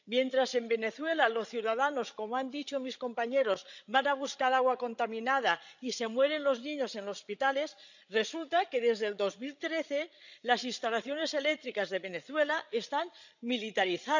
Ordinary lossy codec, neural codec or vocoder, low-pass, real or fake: none; codec, 16 kHz, 16 kbps, FreqCodec, larger model; 7.2 kHz; fake